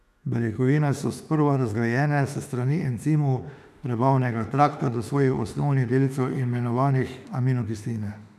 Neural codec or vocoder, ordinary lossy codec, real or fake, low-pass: autoencoder, 48 kHz, 32 numbers a frame, DAC-VAE, trained on Japanese speech; none; fake; 14.4 kHz